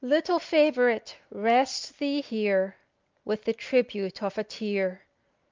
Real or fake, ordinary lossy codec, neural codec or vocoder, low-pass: real; Opus, 24 kbps; none; 7.2 kHz